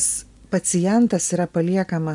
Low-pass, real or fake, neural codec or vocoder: 10.8 kHz; real; none